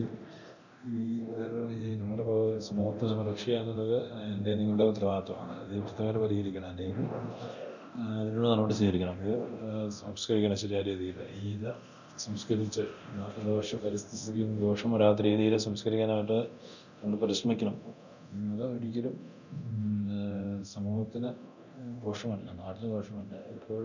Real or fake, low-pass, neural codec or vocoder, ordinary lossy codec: fake; 7.2 kHz; codec, 24 kHz, 0.9 kbps, DualCodec; none